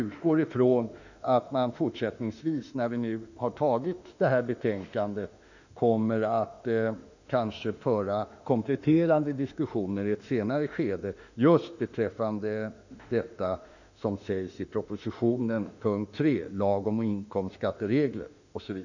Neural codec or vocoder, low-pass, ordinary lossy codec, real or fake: autoencoder, 48 kHz, 32 numbers a frame, DAC-VAE, trained on Japanese speech; 7.2 kHz; none; fake